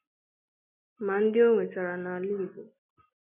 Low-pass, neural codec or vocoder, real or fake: 3.6 kHz; none; real